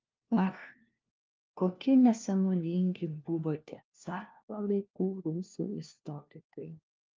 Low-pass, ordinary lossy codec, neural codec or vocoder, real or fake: 7.2 kHz; Opus, 24 kbps; codec, 16 kHz, 1 kbps, FunCodec, trained on LibriTTS, 50 frames a second; fake